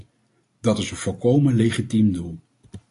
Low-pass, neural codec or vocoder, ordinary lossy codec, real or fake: 10.8 kHz; none; AAC, 48 kbps; real